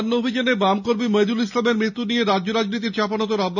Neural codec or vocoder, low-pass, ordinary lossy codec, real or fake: none; 7.2 kHz; none; real